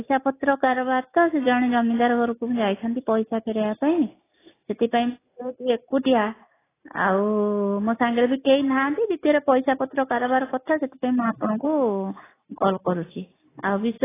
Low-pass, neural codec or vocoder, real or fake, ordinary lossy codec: 3.6 kHz; none; real; AAC, 16 kbps